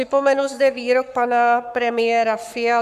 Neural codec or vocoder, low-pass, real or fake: codec, 44.1 kHz, 7.8 kbps, Pupu-Codec; 14.4 kHz; fake